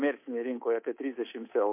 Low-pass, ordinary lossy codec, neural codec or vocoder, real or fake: 3.6 kHz; MP3, 24 kbps; codec, 24 kHz, 3.1 kbps, DualCodec; fake